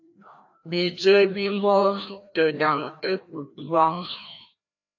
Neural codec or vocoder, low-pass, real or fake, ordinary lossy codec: codec, 16 kHz, 1 kbps, FreqCodec, larger model; 7.2 kHz; fake; AAC, 48 kbps